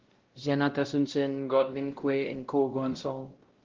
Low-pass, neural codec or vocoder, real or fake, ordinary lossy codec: 7.2 kHz; codec, 16 kHz, 0.5 kbps, X-Codec, WavLM features, trained on Multilingual LibriSpeech; fake; Opus, 16 kbps